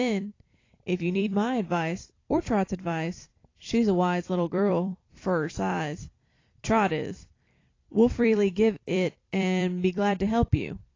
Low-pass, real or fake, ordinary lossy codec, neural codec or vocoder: 7.2 kHz; fake; AAC, 32 kbps; vocoder, 44.1 kHz, 128 mel bands every 256 samples, BigVGAN v2